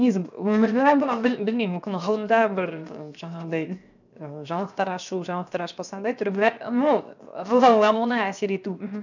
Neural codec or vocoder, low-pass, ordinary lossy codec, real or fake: codec, 16 kHz, 0.7 kbps, FocalCodec; 7.2 kHz; none; fake